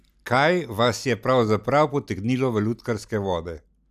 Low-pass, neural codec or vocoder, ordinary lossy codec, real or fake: 14.4 kHz; none; none; real